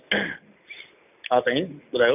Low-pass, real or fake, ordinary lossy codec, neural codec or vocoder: 3.6 kHz; real; none; none